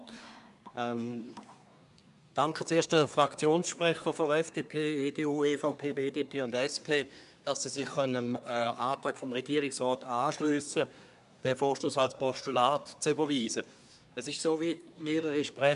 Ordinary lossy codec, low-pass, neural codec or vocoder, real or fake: none; 10.8 kHz; codec, 24 kHz, 1 kbps, SNAC; fake